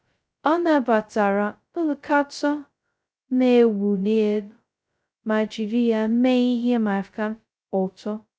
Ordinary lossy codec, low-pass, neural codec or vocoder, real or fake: none; none; codec, 16 kHz, 0.2 kbps, FocalCodec; fake